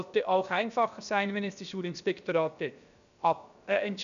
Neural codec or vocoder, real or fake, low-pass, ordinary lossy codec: codec, 16 kHz, about 1 kbps, DyCAST, with the encoder's durations; fake; 7.2 kHz; none